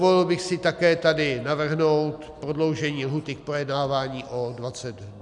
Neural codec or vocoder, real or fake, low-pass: none; real; 10.8 kHz